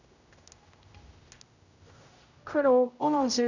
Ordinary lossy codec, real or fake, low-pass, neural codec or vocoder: none; fake; 7.2 kHz; codec, 16 kHz, 0.5 kbps, X-Codec, HuBERT features, trained on general audio